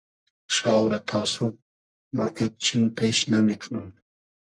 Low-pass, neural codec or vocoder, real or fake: 9.9 kHz; codec, 44.1 kHz, 1.7 kbps, Pupu-Codec; fake